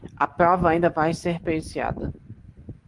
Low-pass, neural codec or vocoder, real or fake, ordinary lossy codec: 10.8 kHz; none; real; Opus, 24 kbps